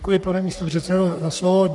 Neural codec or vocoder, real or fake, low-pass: codec, 44.1 kHz, 3.4 kbps, Pupu-Codec; fake; 10.8 kHz